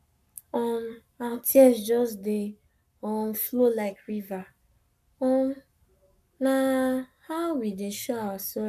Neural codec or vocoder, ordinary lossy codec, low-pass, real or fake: codec, 44.1 kHz, 7.8 kbps, Pupu-Codec; none; 14.4 kHz; fake